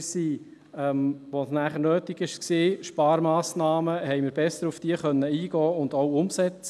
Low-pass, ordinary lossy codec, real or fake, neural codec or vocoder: none; none; real; none